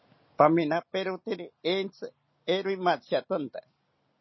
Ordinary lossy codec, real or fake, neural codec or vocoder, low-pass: MP3, 24 kbps; real; none; 7.2 kHz